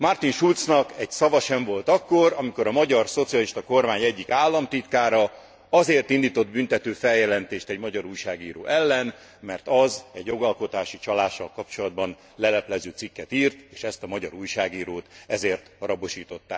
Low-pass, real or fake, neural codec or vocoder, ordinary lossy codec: none; real; none; none